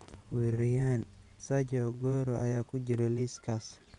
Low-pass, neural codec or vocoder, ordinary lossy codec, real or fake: 10.8 kHz; vocoder, 24 kHz, 100 mel bands, Vocos; none; fake